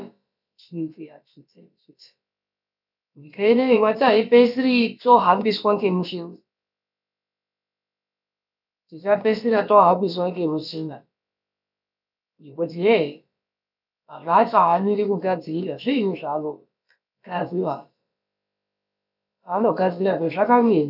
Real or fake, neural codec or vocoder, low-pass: fake; codec, 16 kHz, about 1 kbps, DyCAST, with the encoder's durations; 5.4 kHz